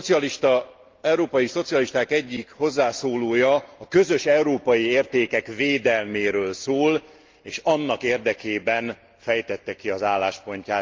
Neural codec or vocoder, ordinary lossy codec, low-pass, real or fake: none; Opus, 32 kbps; 7.2 kHz; real